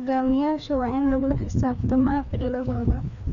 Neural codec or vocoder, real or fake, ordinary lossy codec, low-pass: codec, 16 kHz, 2 kbps, FreqCodec, larger model; fake; none; 7.2 kHz